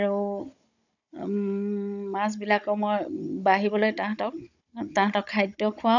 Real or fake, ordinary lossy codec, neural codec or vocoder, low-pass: fake; none; codec, 16 kHz, 16 kbps, FunCodec, trained on Chinese and English, 50 frames a second; 7.2 kHz